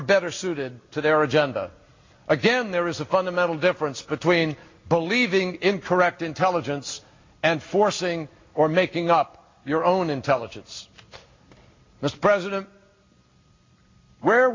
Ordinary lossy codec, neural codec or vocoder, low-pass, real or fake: MP3, 48 kbps; none; 7.2 kHz; real